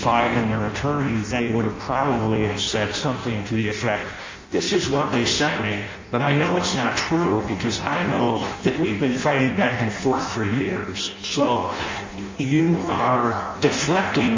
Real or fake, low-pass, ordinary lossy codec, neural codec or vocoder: fake; 7.2 kHz; MP3, 64 kbps; codec, 16 kHz in and 24 kHz out, 0.6 kbps, FireRedTTS-2 codec